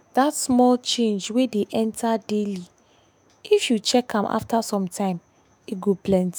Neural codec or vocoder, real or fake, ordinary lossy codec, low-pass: autoencoder, 48 kHz, 128 numbers a frame, DAC-VAE, trained on Japanese speech; fake; none; none